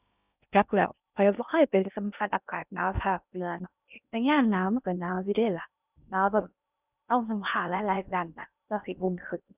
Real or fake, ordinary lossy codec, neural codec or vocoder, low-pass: fake; none; codec, 16 kHz in and 24 kHz out, 0.6 kbps, FocalCodec, streaming, 2048 codes; 3.6 kHz